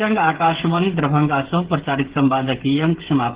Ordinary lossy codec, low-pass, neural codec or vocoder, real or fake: Opus, 16 kbps; 3.6 kHz; codec, 16 kHz, 16 kbps, FreqCodec, smaller model; fake